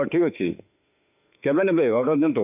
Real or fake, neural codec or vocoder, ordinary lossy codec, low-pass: fake; autoencoder, 48 kHz, 32 numbers a frame, DAC-VAE, trained on Japanese speech; none; 3.6 kHz